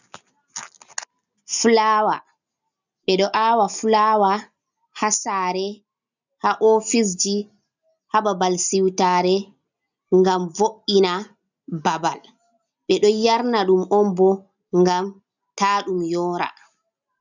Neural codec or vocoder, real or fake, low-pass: none; real; 7.2 kHz